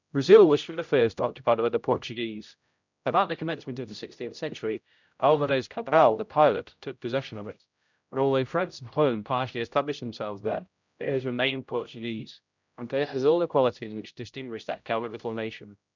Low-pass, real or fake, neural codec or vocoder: 7.2 kHz; fake; codec, 16 kHz, 0.5 kbps, X-Codec, HuBERT features, trained on general audio